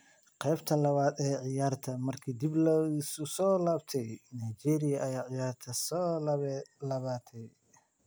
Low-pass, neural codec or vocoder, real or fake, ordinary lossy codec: none; none; real; none